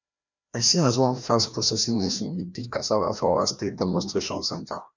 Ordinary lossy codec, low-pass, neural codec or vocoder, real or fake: none; 7.2 kHz; codec, 16 kHz, 1 kbps, FreqCodec, larger model; fake